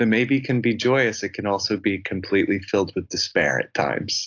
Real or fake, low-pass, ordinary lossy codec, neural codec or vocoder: real; 7.2 kHz; AAC, 48 kbps; none